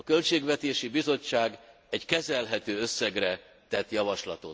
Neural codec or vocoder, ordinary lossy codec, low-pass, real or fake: none; none; none; real